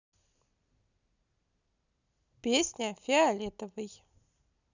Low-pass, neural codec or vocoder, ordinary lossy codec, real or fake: 7.2 kHz; none; none; real